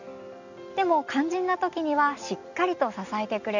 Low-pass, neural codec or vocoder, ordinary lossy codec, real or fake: 7.2 kHz; none; none; real